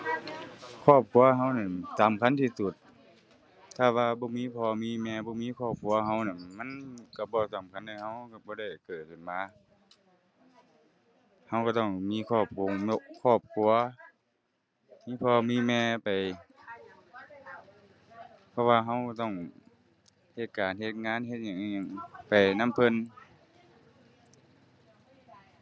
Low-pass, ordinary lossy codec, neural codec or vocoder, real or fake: none; none; none; real